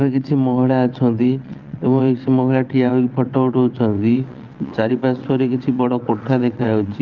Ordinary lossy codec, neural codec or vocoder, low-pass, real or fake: Opus, 24 kbps; vocoder, 22.05 kHz, 80 mel bands, WaveNeXt; 7.2 kHz; fake